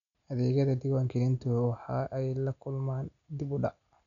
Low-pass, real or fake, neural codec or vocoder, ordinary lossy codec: 7.2 kHz; real; none; none